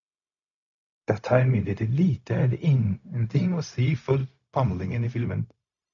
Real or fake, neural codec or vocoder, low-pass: fake; codec, 16 kHz, 0.4 kbps, LongCat-Audio-Codec; 7.2 kHz